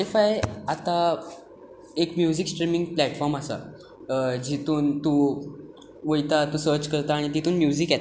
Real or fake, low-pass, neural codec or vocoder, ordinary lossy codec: real; none; none; none